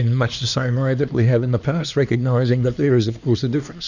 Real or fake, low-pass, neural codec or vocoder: fake; 7.2 kHz; codec, 16 kHz, 2 kbps, X-Codec, HuBERT features, trained on LibriSpeech